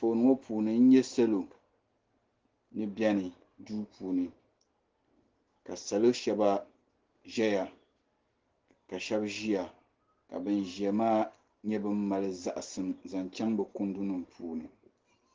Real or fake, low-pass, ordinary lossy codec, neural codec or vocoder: real; 7.2 kHz; Opus, 16 kbps; none